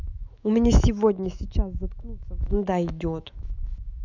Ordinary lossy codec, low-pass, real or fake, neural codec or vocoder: none; 7.2 kHz; fake; autoencoder, 48 kHz, 128 numbers a frame, DAC-VAE, trained on Japanese speech